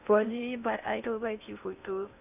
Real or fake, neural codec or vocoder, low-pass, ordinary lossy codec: fake; codec, 16 kHz in and 24 kHz out, 0.6 kbps, FocalCodec, streaming, 4096 codes; 3.6 kHz; none